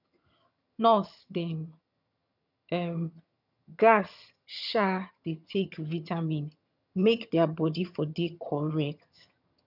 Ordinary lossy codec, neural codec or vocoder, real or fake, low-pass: none; vocoder, 22.05 kHz, 80 mel bands, HiFi-GAN; fake; 5.4 kHz